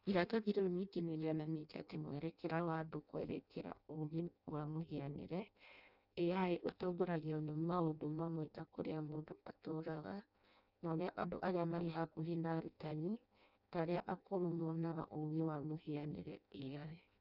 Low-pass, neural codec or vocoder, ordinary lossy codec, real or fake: 5.4 kHz; codec, 16 kHz in and 24 kHz out, 0.6 kbps, FireRedTTS-2 codec; none; fake